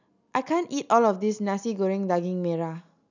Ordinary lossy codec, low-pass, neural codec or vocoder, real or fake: none; 7.2 kHz; none; real